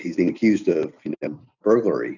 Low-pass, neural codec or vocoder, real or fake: 7.2 kHz; none; real